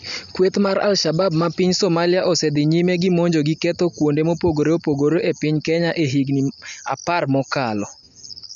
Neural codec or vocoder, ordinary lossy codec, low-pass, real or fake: none; none; 7.2 kHz; real